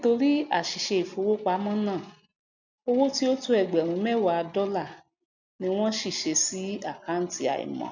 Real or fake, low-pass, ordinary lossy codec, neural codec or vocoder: real; 7.2 kHz; none; none